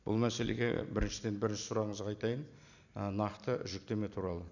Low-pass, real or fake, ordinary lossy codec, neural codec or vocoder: 7.2 kHz; real; none; none